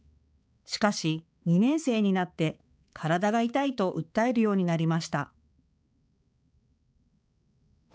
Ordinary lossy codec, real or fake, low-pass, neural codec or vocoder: none; fake; none; codec, 16 kHz, 4 kbps, X-Codec, HuBERT features, trained on balanced general audio